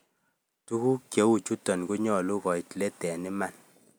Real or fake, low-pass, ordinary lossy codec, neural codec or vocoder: real; none; none; none